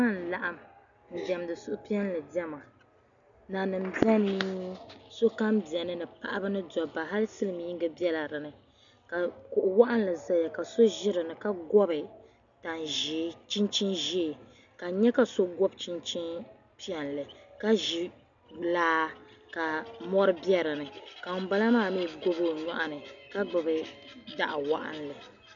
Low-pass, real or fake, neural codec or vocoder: 7.2 kHz; real; none